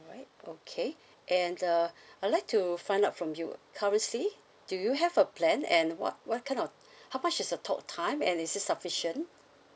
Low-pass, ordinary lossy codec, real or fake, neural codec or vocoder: none; none; real; none